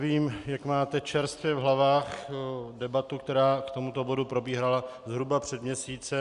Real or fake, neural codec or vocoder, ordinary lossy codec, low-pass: real; none; Opus, 64 kbps; 10.8 kHz